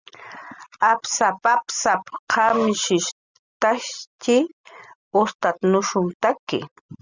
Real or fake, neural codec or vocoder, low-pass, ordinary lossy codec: real; none; 7.2 kHz; Opus, 64 kbps